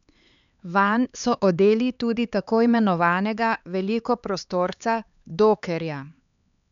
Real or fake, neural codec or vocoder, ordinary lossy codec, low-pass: fake; codec, 16 kHz, 4 kbps, X-Codec, HuBERT features, trained on LibriSpeech; none; 7.2 kHz